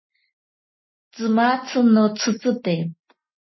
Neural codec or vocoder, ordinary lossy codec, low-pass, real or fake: none; MP3, 24 kbps; 7.2 kHz; real